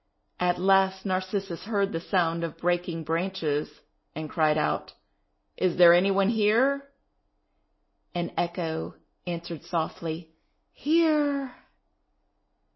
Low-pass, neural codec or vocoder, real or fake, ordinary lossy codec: 7.2 kHz; none; real; MP3, 24 kbps